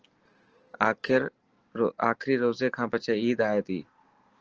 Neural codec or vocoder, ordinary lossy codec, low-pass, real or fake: none; Opus, 16 kbps; 7.2 kHz; real